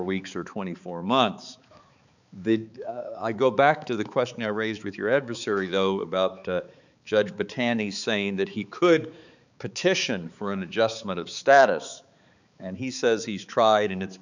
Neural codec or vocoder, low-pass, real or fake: codec, 16 kHz, 4 kbps, X-Codec, HuBERT features, trained on balanced general audio; 7.2 kHz; fake